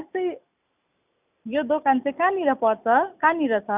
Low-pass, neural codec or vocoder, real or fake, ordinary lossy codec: 3.6 kHz; none; real; none